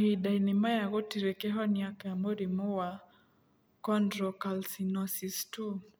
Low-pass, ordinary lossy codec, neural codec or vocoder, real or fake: none; none; none; real